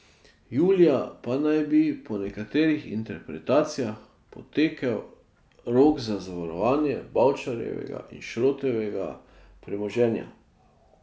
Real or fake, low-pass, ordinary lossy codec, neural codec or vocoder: real; none; none; none